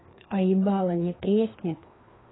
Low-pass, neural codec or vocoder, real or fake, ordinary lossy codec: 7.2 kHz; codec, 16 kHz, 2 kbps, FreqCodec, larger model; fake; AAC, 16 kbps